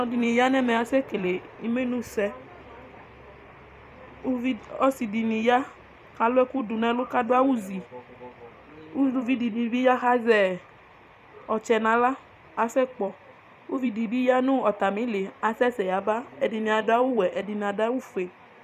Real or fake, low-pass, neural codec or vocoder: fake; 14.4 kHz; vocoder, 44.1 kHz, 128 mel bands every 256 samples, BigVGAN v2